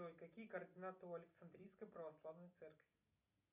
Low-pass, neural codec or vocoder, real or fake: 3.6 kHz; none; real